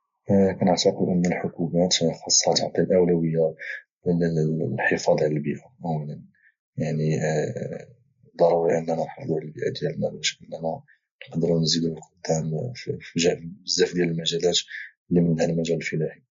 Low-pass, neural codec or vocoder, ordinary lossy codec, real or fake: 7.2 kHz; none; MP3, 48 kbps; real